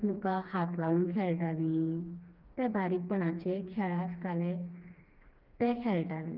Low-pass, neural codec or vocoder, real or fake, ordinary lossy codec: 5.4 kHz; codec, 16 kHz, 2 kbps, FreqCodec, smaller model; fake; Opus, 24 kbps